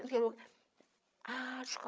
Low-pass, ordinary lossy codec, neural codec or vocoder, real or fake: none; none; codec, 16 kHz, 16 kbps, FreqCodec, larger model; fake